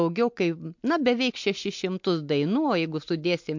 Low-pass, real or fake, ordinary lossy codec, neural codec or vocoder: 7.2 kHz; real; MP3, 48 kbps; none